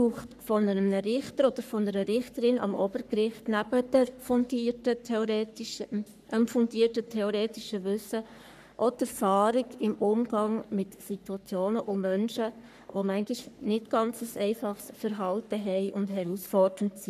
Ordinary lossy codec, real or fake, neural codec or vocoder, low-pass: none; fake; codec, 44.1 kHz, 3.4 kbps, Pupu-Codec; 14.4 kHz